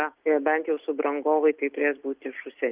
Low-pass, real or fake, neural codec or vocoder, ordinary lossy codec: 3.6 kHz; real; none; Opus, 32 kbps